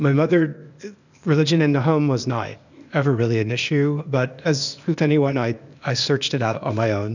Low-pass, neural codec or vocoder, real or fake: 7.2 kHz; codec, 16 kHz, 0.8 kbps, ZipCodec; fake